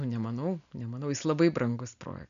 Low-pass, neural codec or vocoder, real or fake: 7.2 kHz; none; real